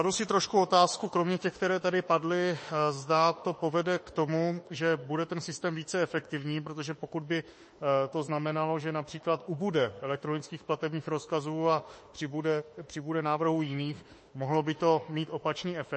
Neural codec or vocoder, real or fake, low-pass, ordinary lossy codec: autoencoder, 48 kHz, 32 numbers a frame, DAC-VAE, trained on Japanese speech; fake; 9.9 kHz; MP3, 32 kbps